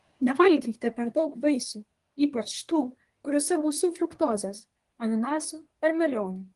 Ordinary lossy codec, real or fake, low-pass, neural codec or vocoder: Opus, 24 kbps; fake; 10.8 kHz; codec, 24 kHz, 1 kbps, SNAC